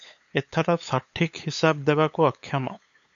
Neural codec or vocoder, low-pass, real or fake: codec, 16 kHz, 8 kbps, FunCodec, trained on LibriTTS, 25 frames a second; 7.2 kHz; fake